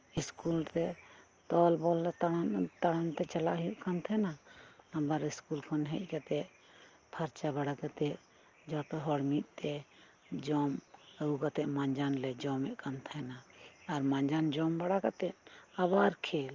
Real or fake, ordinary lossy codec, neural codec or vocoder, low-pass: real; Opus, 24 kbps; none; 7.2 kHz